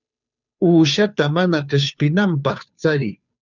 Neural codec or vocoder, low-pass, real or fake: codec, 16 kHz, 2 kbps, FunCodec, trained on Chinese and English, 25 frames a second; 7.2 kHz; fake